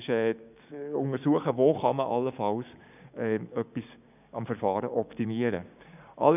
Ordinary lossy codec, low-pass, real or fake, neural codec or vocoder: none; 3.6 kHz; fake; codec, 16 kHz, 6 kbps, DAC